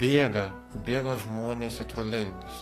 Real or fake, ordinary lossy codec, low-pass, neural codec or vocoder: fake; AAC, 48 kbps; 14.4 kHz; codec, 44.1 kHz, 2.6 kbps, SNAC